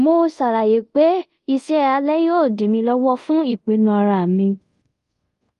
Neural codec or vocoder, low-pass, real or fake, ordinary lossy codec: codec, 24 kHz, 0.5 kbps, DualCodec; 10.8 kHz; fake; Opus, 32 kbps